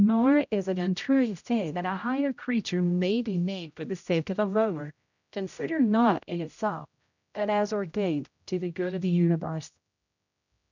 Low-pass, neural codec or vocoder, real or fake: 7.2 kHz; codec, 16 kHz, 0.5 kbps, X-Codec, HuBERT features, trained on general audio; fake